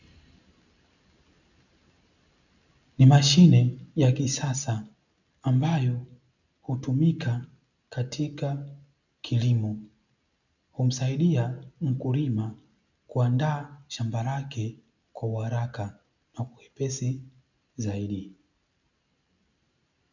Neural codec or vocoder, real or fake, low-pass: none; real; 7.2 kHz